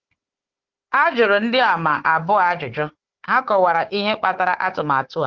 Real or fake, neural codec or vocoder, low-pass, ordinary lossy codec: fake; codec, 16 kHz, 4 kbps, FunCodec, trained on Chinese and English, 50 frames a second; 7.2 kHz; Opus, 16 kbps